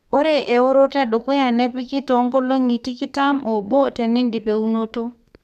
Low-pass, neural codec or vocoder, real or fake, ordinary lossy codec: 14.4 kHz; codec, 32 kHz, 1.9 kbps, SNAC; fake; none